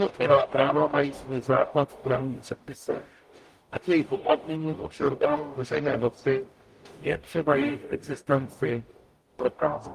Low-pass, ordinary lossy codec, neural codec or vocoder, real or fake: 14.4 kHz; Opus, 32 kbps; codec, 44.1 kHz, 0.9 kbps, DAC; fake